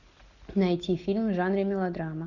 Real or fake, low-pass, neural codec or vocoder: real; 7.2 kHz; none